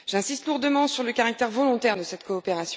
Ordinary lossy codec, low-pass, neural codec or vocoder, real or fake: none; none; none; real